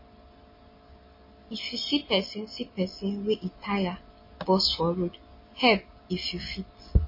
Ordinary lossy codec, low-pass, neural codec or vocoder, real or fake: MP3, 24 kbps; 5.4 kHz; none; real